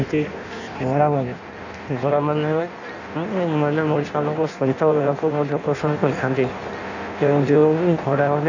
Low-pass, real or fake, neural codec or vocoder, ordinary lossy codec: 7.2 kHz; fake; codec, 16 kHz in and 24 kHz out, 0.6 kbps, FireRedTTS-2 codec; none